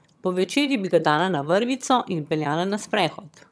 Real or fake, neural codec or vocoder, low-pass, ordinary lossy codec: fake; vocoder, 22.05 kHz, 80 mel bands, HiFi-GAN; none; none